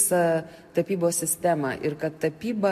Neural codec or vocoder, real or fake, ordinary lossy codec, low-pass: none; real; MP3, 64 kbps; 14.4 kHz